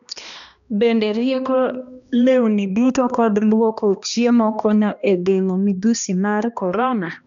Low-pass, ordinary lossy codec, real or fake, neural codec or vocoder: 7.2 kHz; none; fake; codec, 16 kHz, 1 kbps, X-Codec, HuBERT features, trained on balanced general audio